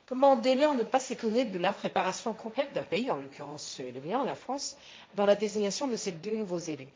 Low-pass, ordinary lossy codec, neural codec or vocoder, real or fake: none; none; codec, 16 kHz, 1.1 kbps, Voila-Tokenizer; fake